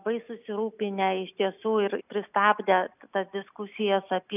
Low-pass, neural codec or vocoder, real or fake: 3.6 kHz; none; real